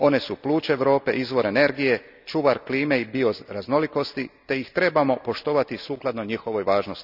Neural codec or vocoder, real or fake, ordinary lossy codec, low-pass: none; real; none; 5.4 kHz